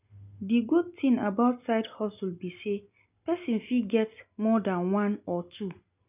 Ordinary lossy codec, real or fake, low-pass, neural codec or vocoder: none; real; 3.6 kHz; none